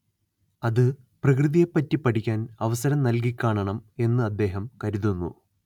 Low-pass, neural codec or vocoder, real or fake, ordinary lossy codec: 19.8 kHz; none; real; none